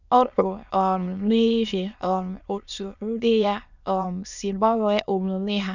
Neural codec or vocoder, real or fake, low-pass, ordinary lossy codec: autoencoder, 22.05 kHz, a latent of 192 numbers a frame, VITS, trained on many speakers; fake; 7.2 kHz; none